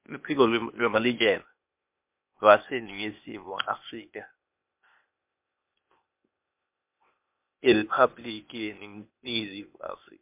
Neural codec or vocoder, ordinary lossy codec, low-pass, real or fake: codec, 16 kHz, 0.8 kbps, ZipCodec; MP3, 32 kbps; 3.6 kHz; fake